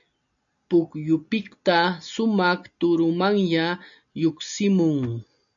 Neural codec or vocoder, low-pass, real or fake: none; 7.2 kHz; real